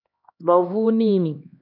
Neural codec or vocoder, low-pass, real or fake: codec, 16 kHz, 2 kbps, X-Codec, HuBERT features, trained on LibriSpeech; 5.4 kHz; fake